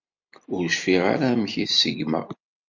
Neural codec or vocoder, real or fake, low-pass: none; real; 7.2 kHz